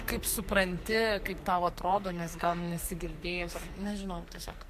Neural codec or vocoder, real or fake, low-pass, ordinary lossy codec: codec, 44.1 kHz, 2.6 kbps, SNAC; fake; 14.4 kHz; MP3, 64 kbps